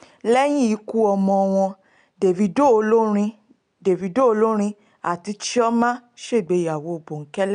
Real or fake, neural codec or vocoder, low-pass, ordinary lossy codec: real; none; 9.9 kHz; none